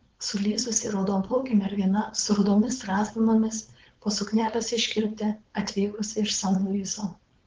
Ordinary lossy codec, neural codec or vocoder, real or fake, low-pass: Opus, 16 kbps; codec, 16 kHz, 4.8 kbps, FACodec; fake; 7.2 kHz